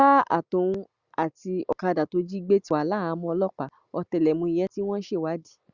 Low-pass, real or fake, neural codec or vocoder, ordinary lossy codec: 7.2 kHz; real; none; Opus, 64 kbps